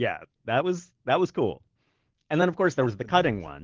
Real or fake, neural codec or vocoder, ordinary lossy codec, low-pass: real; none; Opus, 16 kbps; 7.2 kHz